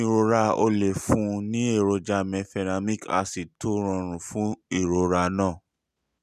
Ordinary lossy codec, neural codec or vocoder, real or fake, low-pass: none; none; real; 14.4 kHz